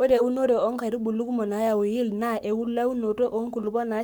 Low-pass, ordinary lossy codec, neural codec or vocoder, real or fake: 19.8 kHz; none; codec, 44.1 kHz, 7.8 kbps, Pupu-Codec; fake